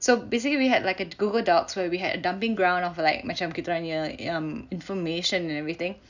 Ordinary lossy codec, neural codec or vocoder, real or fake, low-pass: none; none; real; 7.2 kHz